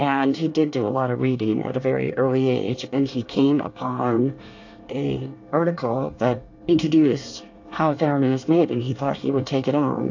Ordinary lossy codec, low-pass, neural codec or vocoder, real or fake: AAC, 48 kbps; 7.2 kHz; codec, 24 kHz, 1 kbps, SNAC; fake